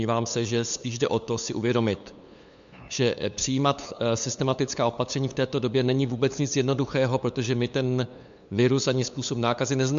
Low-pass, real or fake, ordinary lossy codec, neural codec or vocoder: 7.2 kHz; fake; MP3, 64 kbps; codec, 16 kHz, 8 kbps, FunCodec, trained on LibriTTS, 25 frames a second